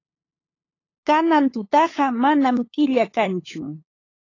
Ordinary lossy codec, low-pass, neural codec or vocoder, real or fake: AAC, 32 kbps; 7.2 kHz; codec, 16 kHz, 8 kbps, FunCodec, trained on LibriTTS, 25 frames a second; fake